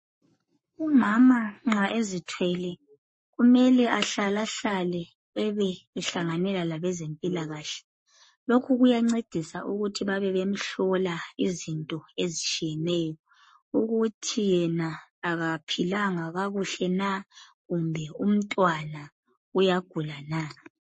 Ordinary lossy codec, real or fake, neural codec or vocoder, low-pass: MP3, 32 kbps; fake; codec, 44.1 kHz, 7.8 kbps, Pupu-Codec; 10.8 kHz